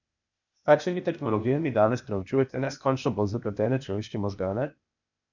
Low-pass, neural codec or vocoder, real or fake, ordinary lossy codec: 7.2 kHz; codec, 16 kHz, 0.8 kbps, ZipCodec; fake; none